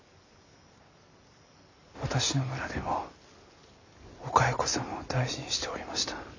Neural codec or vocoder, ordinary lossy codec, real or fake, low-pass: none; AAC, 48 kbps; real; 7.2 kHz